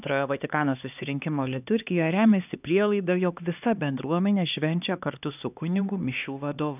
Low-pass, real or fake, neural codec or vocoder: 3.6 kHz; fake; codec, 16 kHz, 2 kbps, X-Codec, HuBERT features, trained on LibriSpeech